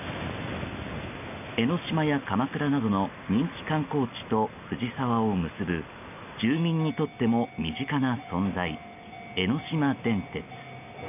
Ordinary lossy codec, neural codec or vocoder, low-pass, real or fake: none; none; 3.6 kHz; real